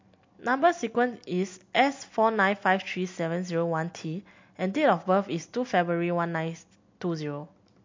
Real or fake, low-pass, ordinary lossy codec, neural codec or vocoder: real; 7.2 kHz; MP3, 48 kbps; none